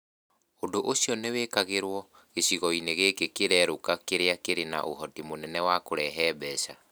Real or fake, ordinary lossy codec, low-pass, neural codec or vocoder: real; none; none; none